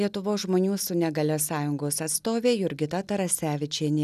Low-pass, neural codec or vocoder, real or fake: 14.4 kHz; none; real